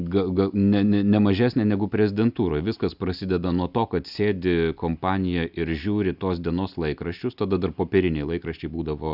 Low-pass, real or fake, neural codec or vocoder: 5.4 kHz; real; none